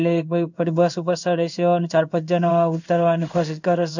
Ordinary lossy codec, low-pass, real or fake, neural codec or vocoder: none; 7.2 kHz; fake; codec, 16 kHz in and 24 kHz out, 1 kbps, XY-Tokenizer